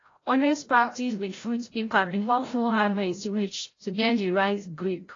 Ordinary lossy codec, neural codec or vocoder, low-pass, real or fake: AAC, 32 kbps; codec, 16 kHz, 0.5 kbps, FreqCodec, larger model; 7.2 kHz; fake